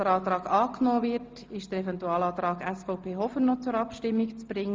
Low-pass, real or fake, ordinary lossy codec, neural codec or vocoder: 7.2 kHz; real; Opus, 32 kbps; none